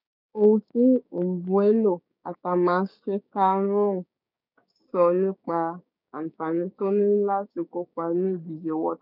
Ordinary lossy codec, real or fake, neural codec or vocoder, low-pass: none; fake; codec, 16 kHz, 6 kbps, DAC; 5.4 kHz